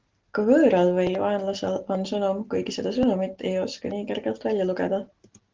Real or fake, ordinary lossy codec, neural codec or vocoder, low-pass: real; Opus, 16 kbps; none; 7.2 kHz